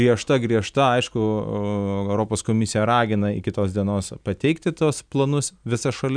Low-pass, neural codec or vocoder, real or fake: 9.9 kHz; none; real